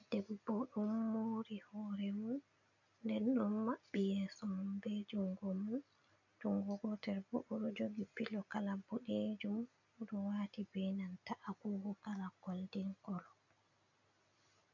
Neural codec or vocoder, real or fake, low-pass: none; real; 7.2 kHz